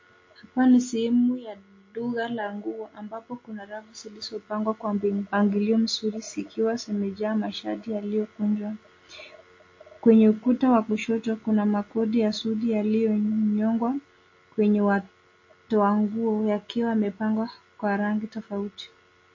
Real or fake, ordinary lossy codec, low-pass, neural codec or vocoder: real; MP3, 32 kbps; 7.2 kHz; none